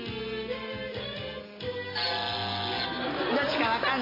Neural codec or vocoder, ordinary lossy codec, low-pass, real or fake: none; MP3, 24 kbps; 5.4 kHz; real